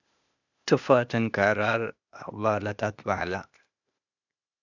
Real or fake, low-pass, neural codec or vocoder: fake; 7.2 kHz; codec, 16 kHz, 0.8 kbps, ZipCodec